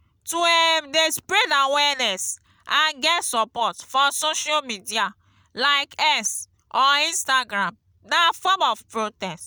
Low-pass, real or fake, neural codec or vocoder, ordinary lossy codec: none; real; none; none